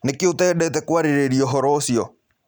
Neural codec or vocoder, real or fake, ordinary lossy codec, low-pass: none; real; none; none